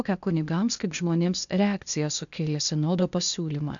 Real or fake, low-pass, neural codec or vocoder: fake; 7.2 kHz; codec, 16 kHz, 0.8 kbps, ZipCodec